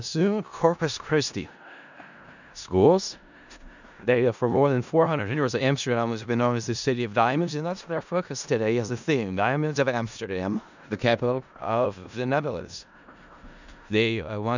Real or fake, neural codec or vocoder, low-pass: fake; codec, 16 kHz in and 24 kHz out, 0.4 kbps, LongCat-Audio-Codec, four codebook decoder; 7.2 kHz